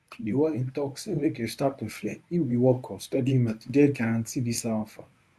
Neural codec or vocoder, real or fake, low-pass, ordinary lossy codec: codec, 24 kHz, 0.9 kbps, WavTokenizer, medium speech release version 1; fake; none; none